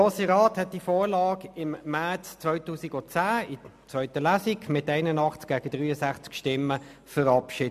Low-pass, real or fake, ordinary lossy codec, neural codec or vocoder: 14.4 kHz; real; MP3, 96 kbps; none